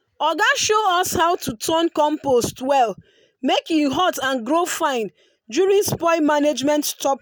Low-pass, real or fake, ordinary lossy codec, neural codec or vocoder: none; real; none; none